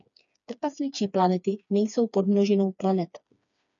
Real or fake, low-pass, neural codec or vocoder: fake; 7.2 kHz; codec, 16 kHz, 4 kbps, FreqCodec, smaller model